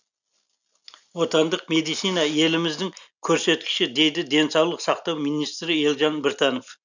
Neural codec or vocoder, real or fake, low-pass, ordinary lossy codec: none; real; 7.2 kHz; none